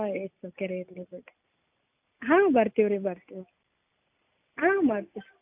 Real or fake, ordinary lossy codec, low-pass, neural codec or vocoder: real; AAC, 32 kbps; 3.6 kHz; none